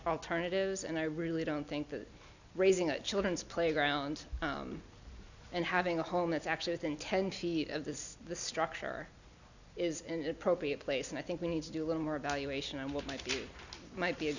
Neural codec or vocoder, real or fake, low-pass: none; real; 7.2 kHz